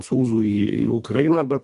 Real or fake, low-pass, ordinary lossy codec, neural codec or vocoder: fake; 10.8 kHz; MP3, 96 kbps; codec, 24 kHz, 1.5 kbps, HILCodec